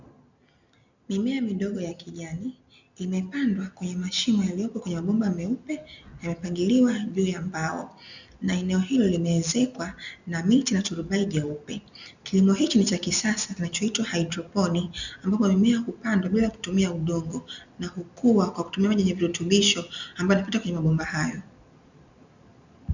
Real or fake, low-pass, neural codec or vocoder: real; 7.2 kHz; none